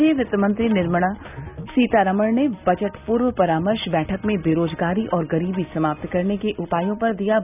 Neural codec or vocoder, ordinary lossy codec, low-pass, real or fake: none; none; 3.6 kHz; real